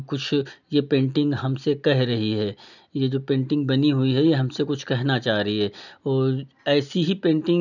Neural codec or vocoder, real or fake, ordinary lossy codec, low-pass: none; real; none; 7.2 kHz